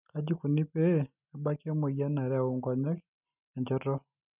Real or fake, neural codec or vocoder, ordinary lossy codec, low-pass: real; none; none; 3.6 kHz